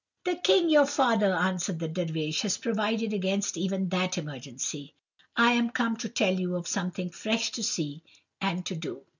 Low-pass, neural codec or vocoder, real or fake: 7.2 kHz; none; real